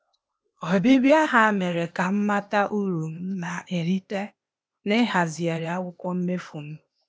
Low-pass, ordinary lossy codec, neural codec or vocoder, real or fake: none; none; codec, 16 kHz, 0.8 kbps, ZipCodec; fake